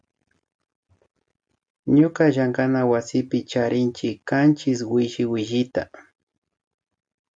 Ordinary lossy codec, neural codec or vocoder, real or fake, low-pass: MP3, 96 kbps; none; real; 9.9 kHz